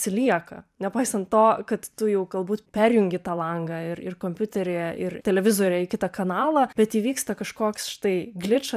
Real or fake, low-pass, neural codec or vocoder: fake; 14.4 kHz; vocoder, 44.1 kHz, 128 mel bands every 512 samples, BigVGAN v2